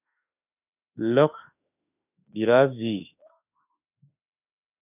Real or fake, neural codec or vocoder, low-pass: fake; autoencoder, 48 kHz, 32 numbers a frame, DAC-VAE, trained on Japanese speech; 3.6 kHz